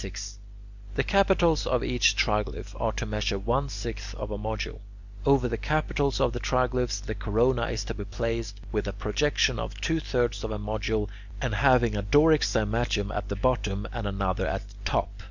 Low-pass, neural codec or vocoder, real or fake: 7.2 kHz; none; real